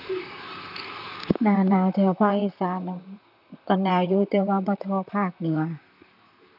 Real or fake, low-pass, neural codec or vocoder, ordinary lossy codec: fake; 5.4 kHz; vocoder, 44.1 kHz, 128 mel bands, Pupu-Vocoder; none